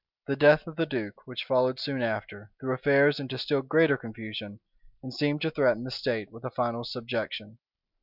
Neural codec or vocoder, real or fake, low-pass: none; real; 5.4 kHz